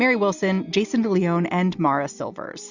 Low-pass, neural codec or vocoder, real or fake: 7.2 kHz; none; real